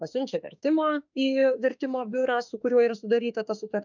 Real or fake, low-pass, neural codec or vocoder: fake; 7.2 kHz; autoencoder, 48 kHz, 32 numbers a frame, DAC-VAE, trained on Japanese speech